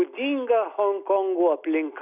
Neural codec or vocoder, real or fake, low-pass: none; real; 3.6 kHz